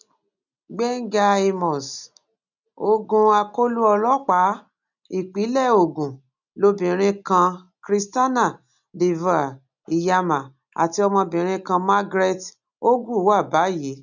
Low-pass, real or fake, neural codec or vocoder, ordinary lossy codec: 7.2 kHz; real; none; none